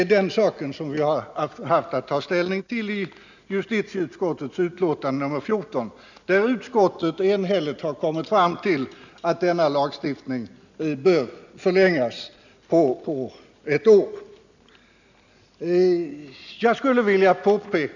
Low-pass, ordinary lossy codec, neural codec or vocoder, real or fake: 7.2 kHz; none; none; real